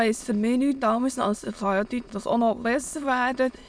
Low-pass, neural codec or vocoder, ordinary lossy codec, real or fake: none; autoencoder, 22.05 kHz, a latent of 192 numbers a frame, VITS, trained on many speakers; none; fake